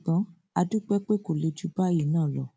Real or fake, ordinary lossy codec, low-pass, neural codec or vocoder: real; none; none; none